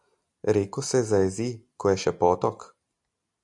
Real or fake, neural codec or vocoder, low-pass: real; none; 10.8 kHz